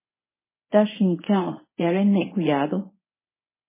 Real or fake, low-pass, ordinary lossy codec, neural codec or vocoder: fake; 3.6 kHz; MP3, 16 kbps; codec, 24 kHz, 0.9 kbps, WavTokenizer, medium speech release version 1